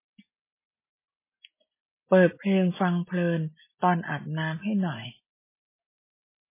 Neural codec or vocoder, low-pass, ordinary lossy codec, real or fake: none; 3.6 kHz; MP3, 16 kbps; real